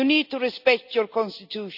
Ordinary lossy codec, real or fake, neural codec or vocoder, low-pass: none; real; none; 5.4 kHz